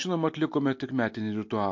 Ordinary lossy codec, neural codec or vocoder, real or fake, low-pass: MP3, 48 kbps; none; real; 7.2 kHz